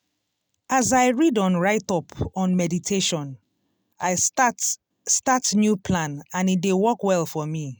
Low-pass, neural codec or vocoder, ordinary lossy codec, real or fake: none; none; none; real